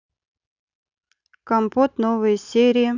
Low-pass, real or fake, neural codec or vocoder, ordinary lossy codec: 7.2 kHz; real; none; none